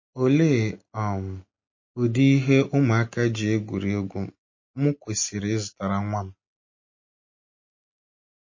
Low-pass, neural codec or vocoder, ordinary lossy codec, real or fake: 7.2 kHz; none; MP3, 32 kbps; real